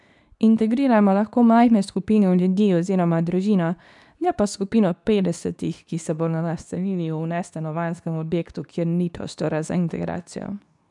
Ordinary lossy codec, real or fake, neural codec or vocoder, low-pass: none; fake; codec, 24 kHz, 0.9 kbps, WavTokenizer, medium speech release version 2; 10.8 kHz